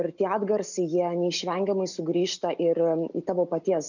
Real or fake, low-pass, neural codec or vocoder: real; 7.2 kHz; none